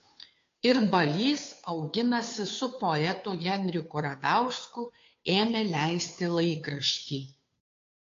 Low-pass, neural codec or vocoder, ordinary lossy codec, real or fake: 7.2 kHz; codec, 16 kHz, 2 kbps, FunCodec, trained on Chinese and English, 25 frames a second; AAC, 48 kbps; fake